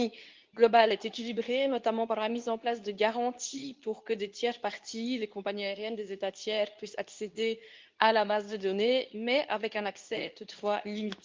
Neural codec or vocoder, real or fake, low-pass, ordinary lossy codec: codec, 24 kHz, 0.9 kbps, WavTokenizer, medium speech release version 2; fake; 7.2 kHz; Opus, 24 kbps